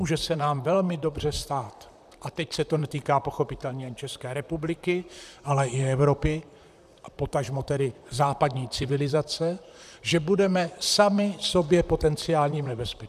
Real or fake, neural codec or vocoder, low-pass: fake; vocoder, 44.1 kHz, 128 mel bands, Pupu-Vocoder; 14.4 kHz